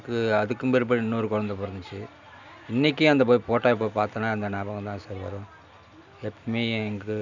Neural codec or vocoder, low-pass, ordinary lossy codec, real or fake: none; 7.2 kHz; none; real